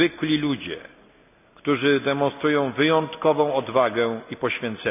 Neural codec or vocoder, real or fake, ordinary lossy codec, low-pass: none; real; none; 3.6 kHz